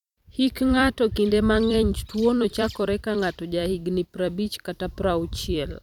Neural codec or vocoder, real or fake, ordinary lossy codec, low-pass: vocoder, 44.1 kHz, 128 mel bands every 256 samples, BigVGAN v2; fake; none; 19.8 kHz